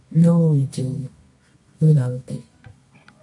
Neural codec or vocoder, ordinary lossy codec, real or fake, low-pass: codec, 24 kHz, 0.9 kbps, WavTokenizer, medium music audio release; MP3, 48 kbps; fake; 10.8 kHz